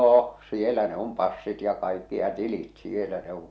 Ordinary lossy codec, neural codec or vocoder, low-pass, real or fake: none; none; none; real